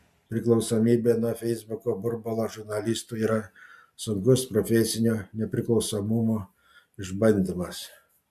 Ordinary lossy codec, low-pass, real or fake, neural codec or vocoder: MP3, 96 kbps; 14.4 kHz; real; none